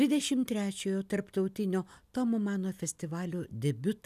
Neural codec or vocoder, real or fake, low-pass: none; real; 14.4 kHz